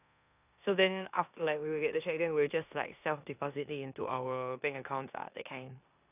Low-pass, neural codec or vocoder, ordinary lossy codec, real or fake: 3.6 kHz; codec, 16 kHz in and 24 kHz out, 0.9 kbps, LongCat-Audio-Codec, four codebook decoder; none; fake